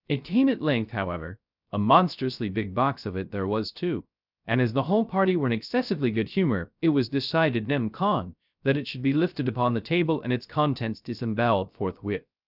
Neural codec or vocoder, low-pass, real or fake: codec, 16 kHz, 0.3 kbps, FocalCodec; 5.4 kHz; fake